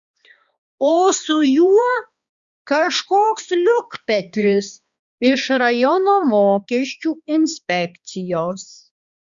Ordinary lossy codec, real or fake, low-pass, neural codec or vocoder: Opus, 64 kbps; fake; 7.2 kHz; codec, 16 kHz, 2 kbps, X-Codec, HuBERT features, trained on balanced general audio